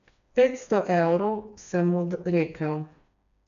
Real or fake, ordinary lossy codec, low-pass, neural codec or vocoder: fake; none; 7.2 kHz; codec, 16 kHz, 2 kbps, FreqCodec, smaller model